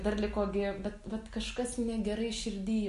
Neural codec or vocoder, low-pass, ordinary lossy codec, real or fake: none; 14.4 kHz; MP3, 48 kbps; real